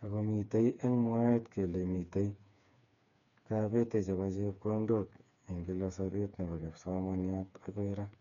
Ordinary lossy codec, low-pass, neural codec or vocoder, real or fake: none; 7.2 kHz; codec, 16 kHz, 4 kbps, FreqCodec, smaller model; fake